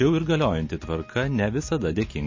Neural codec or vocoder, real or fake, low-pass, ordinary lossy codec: none; real; 7.2 kHz; MP3, 32 kbps